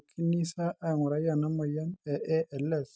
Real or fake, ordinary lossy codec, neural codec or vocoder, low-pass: real; none; none; none